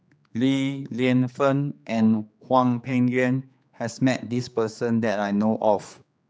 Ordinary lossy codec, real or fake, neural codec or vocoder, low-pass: none; fake; codec, 16 kHz, 4 kbps, X-Codec, HuBERT features, trained on general audio; none